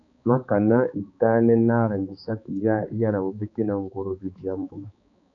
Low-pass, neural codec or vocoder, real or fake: 7.2 kHz; codec, 16 kHz, 4 kbps, X-Codec, HuBERT features, trained on balanced general audio; fake